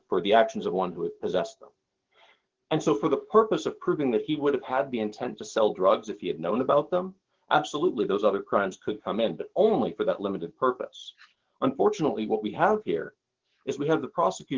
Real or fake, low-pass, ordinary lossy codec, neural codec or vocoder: fake; 7.2 kHz; Opus, 16 kbps; codec, 44.1 kHz, 7.8 kbps, DAC